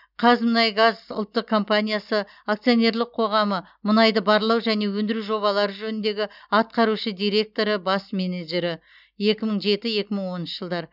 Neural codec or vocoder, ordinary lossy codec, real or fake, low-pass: none; none; real; 5.4 kHz